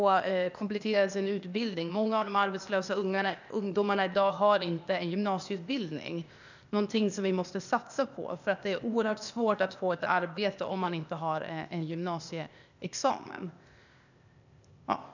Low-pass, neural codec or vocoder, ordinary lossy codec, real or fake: 7.2 kHz; codec, 16 kHz, 0.8 kbps, ZipCodec; none; fake